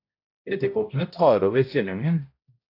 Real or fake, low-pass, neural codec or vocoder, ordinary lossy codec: fake; 5.4 kHz; codec, 16 kHz, 0.5 kbps, X-Codec, HuBERT features, trained on balanced general audio; AAC, 32 kbps